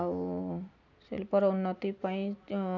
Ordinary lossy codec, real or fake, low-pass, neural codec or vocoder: none; real; 7.2 kHz; none